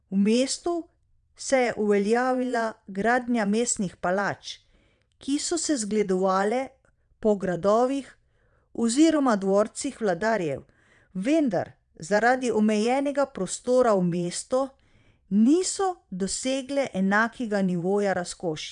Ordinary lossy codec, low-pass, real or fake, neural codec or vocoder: none; 9.9 kHz; fake; vocoder, 22.05 kHz, 80 mel bands, Vocos